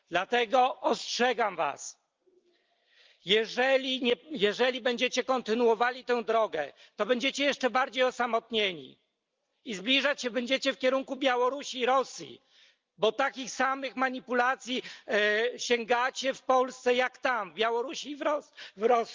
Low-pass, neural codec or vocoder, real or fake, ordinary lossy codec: 7.2 kHz; none; real; Opus, 24 kbps